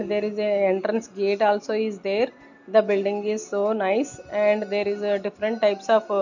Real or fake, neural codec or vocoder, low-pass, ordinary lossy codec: real; none; 7.2 kHz; none